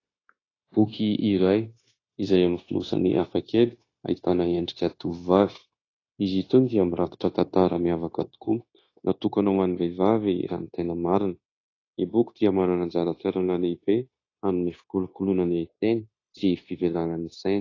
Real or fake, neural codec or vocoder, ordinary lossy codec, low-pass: fake; codec, 16 kHz, 0.9 kbps, LongCat-Audio-Codec; AAC, 32 kbps; 7.2 kHz